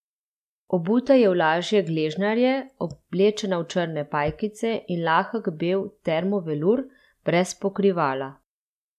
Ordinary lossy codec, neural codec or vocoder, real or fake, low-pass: none; none; real; 14.4 kHz